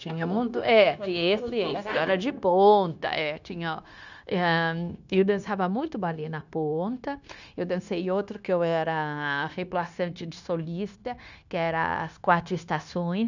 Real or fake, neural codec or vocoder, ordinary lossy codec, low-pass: fake; codec, 16 kHz, 0.9 kbps, LongCat-Audio-Codec; none; 7.2 kHz